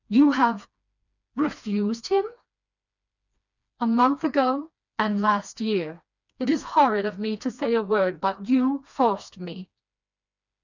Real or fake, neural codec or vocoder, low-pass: fake; codec, 16 kHz, 2 kbps, FreqCodec, smaller model; 7.2 kHz